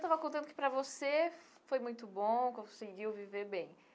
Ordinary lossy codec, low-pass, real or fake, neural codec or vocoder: none; none; real; none